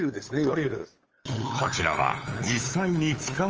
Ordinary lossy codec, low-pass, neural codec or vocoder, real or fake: Opus, 24 kbps; 7.2 kHz; codec, 16 kHz, 8 kbps, FunCodec, trained on LibriTTS, 25 frames a second; fake